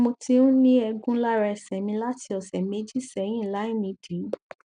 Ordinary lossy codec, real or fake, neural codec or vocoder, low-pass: none; real; none; 9.9 kHz